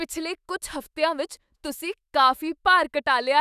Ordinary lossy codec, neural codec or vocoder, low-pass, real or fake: none; autoencoder, 48 kHz, 128 numbers a frame, DAC-VAE, trained on Japanese speech; 14.4 kHz; fake